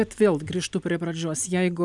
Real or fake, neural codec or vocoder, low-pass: real; none; 10.8 kHz